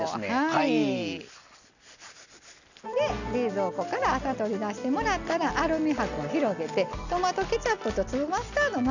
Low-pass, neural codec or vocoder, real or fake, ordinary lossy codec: 7.2 kHz; none; real; none